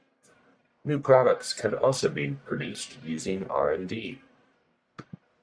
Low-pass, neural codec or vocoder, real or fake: 9.9 kHz; codec, 44.1 kHz, 1.7 kbps, Pupu-Codec; fake